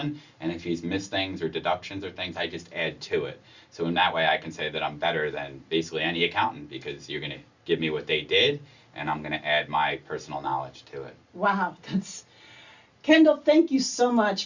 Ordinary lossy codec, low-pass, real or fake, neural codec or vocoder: Opus, 64 kbps; 7.2 kHz; real; none